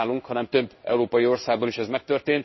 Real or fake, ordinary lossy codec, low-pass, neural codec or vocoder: fake; MP3, 24 kbps; 7.2 kHz; codec, 16 kHz in and 24 kHz out, 1 kbps, XY-Tokenizer